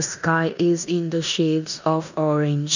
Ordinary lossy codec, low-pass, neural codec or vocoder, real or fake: none; 7.2 kHz; codec, 16 kHz in and 24 kHz out, 0.9 kbps, LongCat-Audio-Codec, four codebook decoder; fake